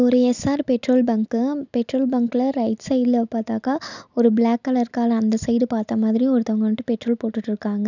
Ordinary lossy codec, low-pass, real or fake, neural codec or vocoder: none; 7.2 kHz; real; none